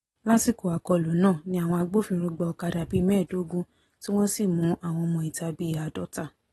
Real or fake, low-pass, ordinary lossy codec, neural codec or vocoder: real; 19.8 kHz; AAC, 32 kbps; none